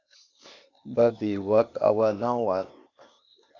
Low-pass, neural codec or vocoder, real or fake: 7.2 kHz; codec, 16 kHz, 0.8 kbps, ZipCodec; fake